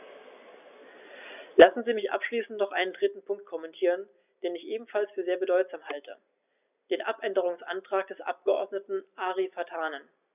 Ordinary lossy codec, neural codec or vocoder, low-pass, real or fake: none; none; 3.6 kHz; real